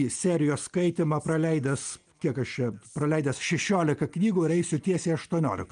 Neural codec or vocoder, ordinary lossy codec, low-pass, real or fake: none; Opus, 32 kbps; 9.9 kHz; real